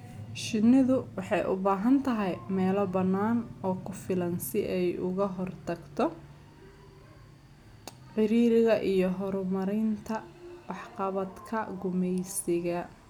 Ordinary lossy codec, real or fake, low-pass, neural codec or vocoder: none; real; 19.8 kHz; none